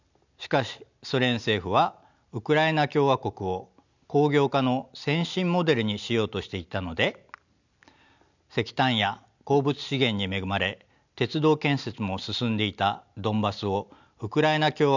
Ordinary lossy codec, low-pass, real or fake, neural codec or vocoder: none; 7.2 kHz; real; none